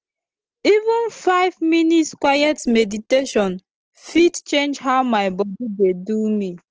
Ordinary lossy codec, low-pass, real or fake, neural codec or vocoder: Opus, 16 kbps; 7.2 kHz; real; none